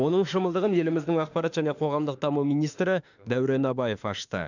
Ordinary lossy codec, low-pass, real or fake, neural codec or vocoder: none; 7.2 kHz; fake; autoencoder, 48 kHz, 32 numbers a frame, DAC-VAE, trained on Japanese speech